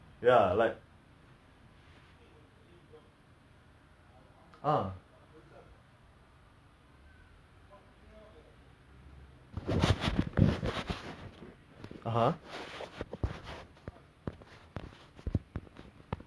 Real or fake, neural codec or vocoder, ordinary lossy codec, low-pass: real; none; none; none